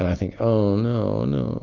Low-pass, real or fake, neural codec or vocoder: 7.2 kHz; real; none